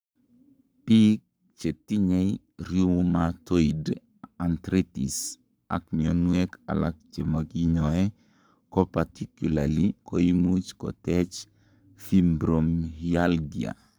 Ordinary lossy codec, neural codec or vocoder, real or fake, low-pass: none; codec, 44.1 kHz, 7.8 kbps, Pupu-Codec; fake; none